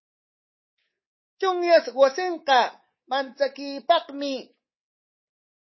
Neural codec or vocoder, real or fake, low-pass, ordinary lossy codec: codec, 24 kHz, 3.1 kbps, DualCodec; fake; 7.2 kHz; MP3, 24 kbps